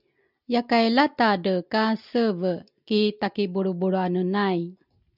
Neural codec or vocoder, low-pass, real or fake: none; 5.4 kHz; real